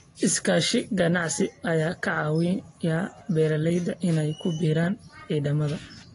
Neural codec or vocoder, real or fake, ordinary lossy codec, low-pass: none; real; AAC, 32 kbps; 10.8 kHz